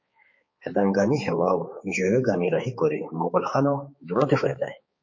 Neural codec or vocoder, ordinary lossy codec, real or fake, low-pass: codec, 16 kHz, 4 kbps, X-Codec, HuBERT features, trained on balanced general audio; MP3, 32 kbps; fake; 7.2 kHz